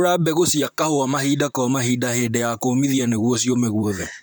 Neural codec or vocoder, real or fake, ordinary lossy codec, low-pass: vocoder, 44.1 kHz, 128 mel bands, Pupu-Vocoder; fake; none; none